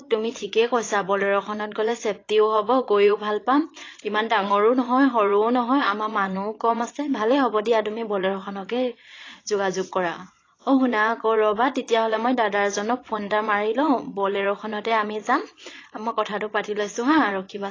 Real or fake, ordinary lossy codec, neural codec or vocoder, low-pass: fake; AAC, 32 kbps; vocoder, 22.05 kHz, 80 mel bands, Vocos; 7.2 kHz